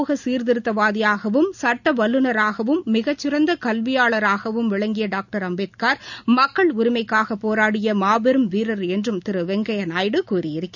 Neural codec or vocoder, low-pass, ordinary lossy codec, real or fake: none; 7.2 kHz; none; real